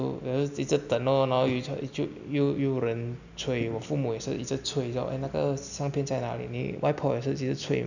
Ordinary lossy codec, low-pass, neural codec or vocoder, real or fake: none; 7.2 kHz; none; real